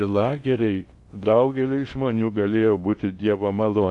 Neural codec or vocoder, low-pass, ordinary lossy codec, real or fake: codec, 16 kHz in and 24 kHz out, 0.8 kbps, FocalCodec, streaming, 65536 codes; 10.8 kHz; AAC, 64 kbps; fake